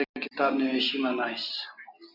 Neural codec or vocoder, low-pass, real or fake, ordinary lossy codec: none; 5.4 kHz; real; AAC, 48 kbps